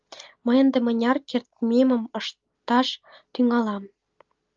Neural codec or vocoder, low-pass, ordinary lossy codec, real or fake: none; 7.2 kHz; Opus, 32 kbps; real